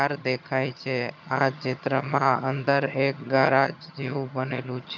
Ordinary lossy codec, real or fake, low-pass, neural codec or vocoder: none; fake; 7.2 kHz; vocoder, 22.05 kHz, 80 mel bands, HiFi-GAN